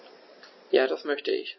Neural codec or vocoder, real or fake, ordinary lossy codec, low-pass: none; real; MP3, 24 kbps; 7.2 kHz